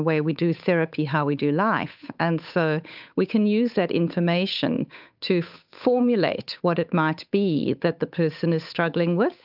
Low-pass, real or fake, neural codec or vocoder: 5.4 kHz; fake; codec, 16 kHz, 8 kbps, FunCodec, trained on Chinese and English, 25 frames a second